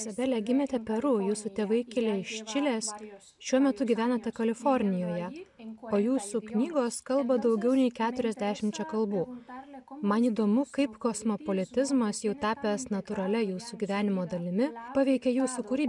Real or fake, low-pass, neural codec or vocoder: real; 10.8 kHz; none